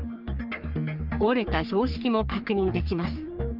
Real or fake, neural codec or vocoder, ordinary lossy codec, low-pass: fake; codec, 44.1 kHz, 3.4 kbps, Pupu-Codec; Opus, 32 kbps; 5.4 kHz